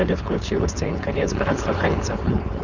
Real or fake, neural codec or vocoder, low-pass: fake; codec, 16 kHz, 4.8 kbps, FACodec; 7.2 kHz